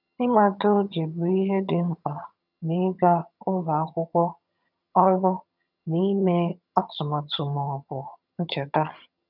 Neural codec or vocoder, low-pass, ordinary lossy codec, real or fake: vocoder, 22.05 kHz, 80 mel bands, HiFi-GAN; 5.4 kHz; none; fake